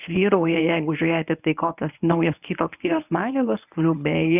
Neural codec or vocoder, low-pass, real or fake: codec, 24 kHz, 0.9 kbps, WavTokenizer, medium speech release version 1; 3.6 kHz; fake